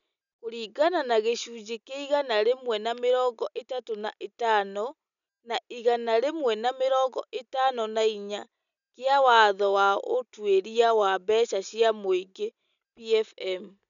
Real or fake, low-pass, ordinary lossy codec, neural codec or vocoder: real; 7.2 kHz; none; none